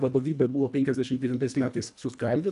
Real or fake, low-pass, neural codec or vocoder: fake; 10.8 kHz; codec, 24 kHz, 1.5 kbps, HILCodec